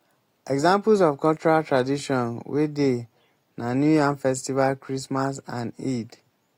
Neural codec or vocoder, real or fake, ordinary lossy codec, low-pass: none; real; AAC, 48 kbps; 19.8 kHz